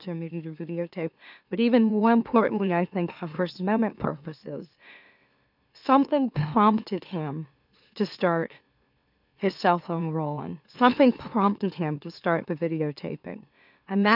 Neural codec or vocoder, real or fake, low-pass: autoencoder, 44.1 kHz, a latent of 192 numbers a frame, MeloTTS; fake; 5.4 kHz